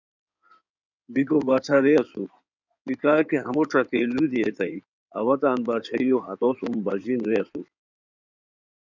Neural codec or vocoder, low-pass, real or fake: codec, 16 kHz in and 24 kHz out, 2.2 kbps, FireRedTTS-2 codec; 7.2 kHz; fake